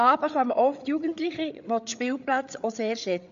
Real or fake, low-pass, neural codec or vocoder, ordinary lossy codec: fake; 7.2 kHz; codec, 16 kHz, 8 kbps, FreqCodec, larger model; MP3, 64 kbps